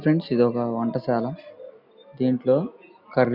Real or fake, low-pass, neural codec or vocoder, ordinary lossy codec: real; 5.4 kHz; none; none